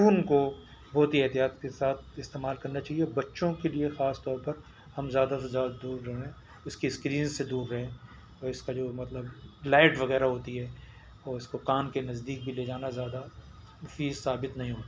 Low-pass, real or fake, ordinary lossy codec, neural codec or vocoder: none; real; none; none